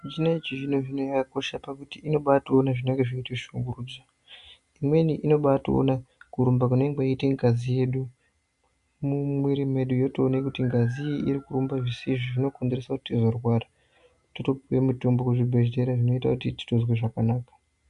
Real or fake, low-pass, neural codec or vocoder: real; 10.8 kHz; none